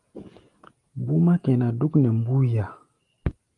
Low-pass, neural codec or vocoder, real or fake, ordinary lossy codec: 10.8 kHz; none; real; Opus, 32 kbps